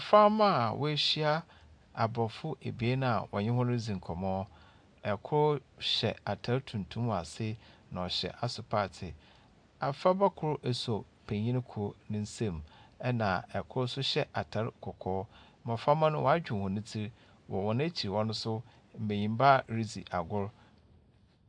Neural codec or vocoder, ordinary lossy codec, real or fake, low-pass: none; AAC, 64 kbps; real; 9.9 kHz